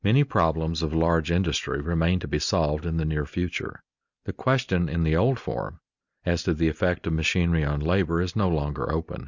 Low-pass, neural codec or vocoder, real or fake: 7.2 kHz; none; real